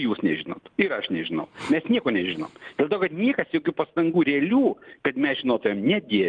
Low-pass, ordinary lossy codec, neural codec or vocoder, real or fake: 9.9 kHz; Opus, 24 kbps; none; real